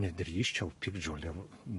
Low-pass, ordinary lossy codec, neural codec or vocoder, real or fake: 14.4 kHz; MP3, 48 kbps; codec, 44.1 kHz, 7.8 kbps, Pupu-Codec; fake